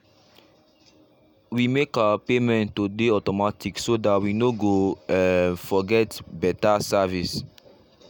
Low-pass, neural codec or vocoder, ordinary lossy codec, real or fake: none; none; none; real